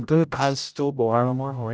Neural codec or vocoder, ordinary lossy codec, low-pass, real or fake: codec, 16 kHz, 0.5 kbps, X-Codec, HuBERT features, trained on general audio; none; none; fake